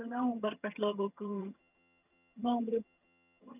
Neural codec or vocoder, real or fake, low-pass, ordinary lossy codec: vocoder, 22.05 kHz, 80 mel bands, HiFi-GAN; fake; 3.6 kHz; none